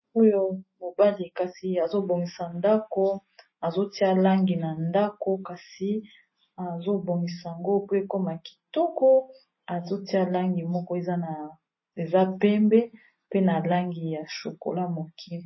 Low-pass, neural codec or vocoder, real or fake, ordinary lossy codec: 7.2 kHz; none; real; MP3, 24 kbps